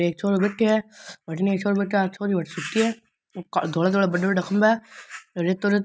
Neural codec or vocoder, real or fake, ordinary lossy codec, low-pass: none; real; none; none